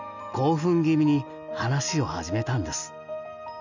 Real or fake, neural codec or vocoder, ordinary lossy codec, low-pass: real; none; none; 7.2 kHz